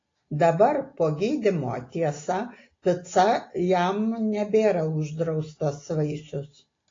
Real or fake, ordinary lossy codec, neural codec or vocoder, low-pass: real; AAC, 32 kbps; none; 7.2 kHz